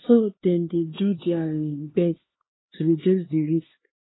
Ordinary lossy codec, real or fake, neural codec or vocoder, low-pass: AAC, 16 kbps; fake; codec, 16 kHz, 2 kbps, X-Codec, HuBERT features, trained on LibriSpeech; 7.2 kHz